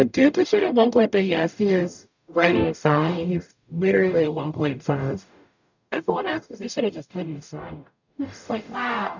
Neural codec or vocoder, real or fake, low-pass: codec, 44.1 kHz, 0.9 kbps, DAC; fake; 7.2 kHz